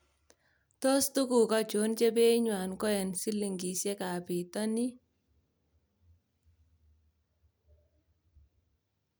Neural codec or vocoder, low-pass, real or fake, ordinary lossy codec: none; none; real; none